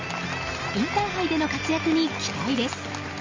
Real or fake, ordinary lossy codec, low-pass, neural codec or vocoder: real; Opus, 32 kbps; 7.2 kHz; none